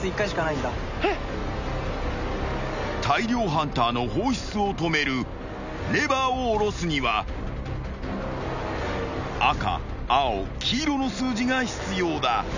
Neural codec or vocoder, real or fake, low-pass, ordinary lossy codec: none; real; 7.2 kHz; none